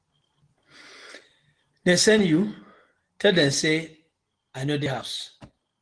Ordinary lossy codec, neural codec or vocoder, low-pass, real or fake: Opus, 16 kbps; none; 9.9 kHz; real